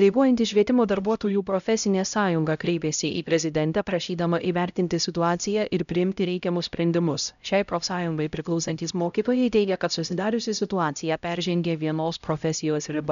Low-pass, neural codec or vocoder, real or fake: 7.2 kHz; codec, 16 kHz, 0.5 kbps, X-Codec, HuBERT features, trained on LibriSpeech; fake